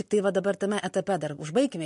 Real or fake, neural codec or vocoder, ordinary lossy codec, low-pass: real; none; MP3, 48 kbps; 14.4 kHz